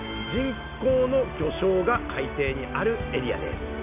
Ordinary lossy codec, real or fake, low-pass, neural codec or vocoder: none; real; 3.6 kHz; none